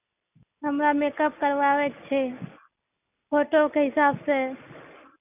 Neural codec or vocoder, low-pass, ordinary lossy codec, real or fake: none; 3.6 kHz; none; real